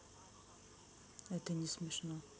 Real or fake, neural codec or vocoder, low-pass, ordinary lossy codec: real; none; none; none